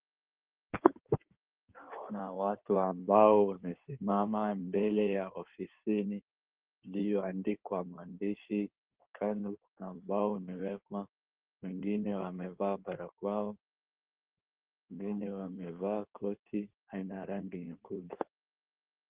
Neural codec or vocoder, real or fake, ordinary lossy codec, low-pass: codec, 16 kHz in and 24 kHz out, 2.2 kbps, FireRedTTS-2 codec; fake; Opus, 16 kbps; 3.6 kHz